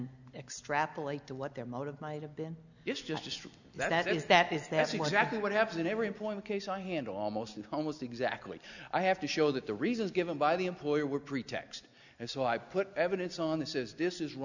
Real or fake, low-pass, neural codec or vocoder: real; 7.2 kHz; none